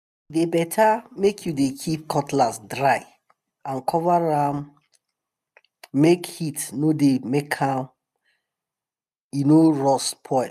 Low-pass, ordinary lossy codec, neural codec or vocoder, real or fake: 14.4 kHz; none; none; real